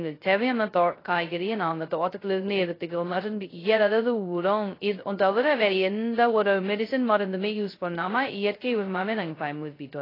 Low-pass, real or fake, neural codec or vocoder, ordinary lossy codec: 5.4 kHz; fake; codec, 16 kHz, 0.2 kbps, FocalCodec; AAC, 24 kbps